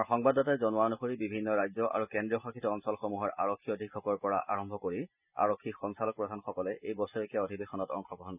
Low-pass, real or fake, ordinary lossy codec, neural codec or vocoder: 3.6 kHz; real; none; none